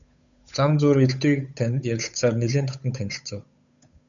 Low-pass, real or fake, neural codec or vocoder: 7.2 kHz; fake; codec, 16 kHz, 8 kbps, FunCodec, trained on Chinese and English, 25 frames a second